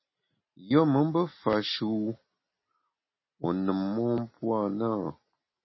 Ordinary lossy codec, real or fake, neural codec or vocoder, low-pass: MP3, 24 kbps; real; none; 7.2 kHz